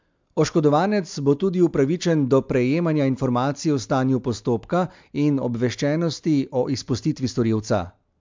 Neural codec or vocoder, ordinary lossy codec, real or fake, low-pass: none; MP3, 64 kbps; real; 7.2 kHz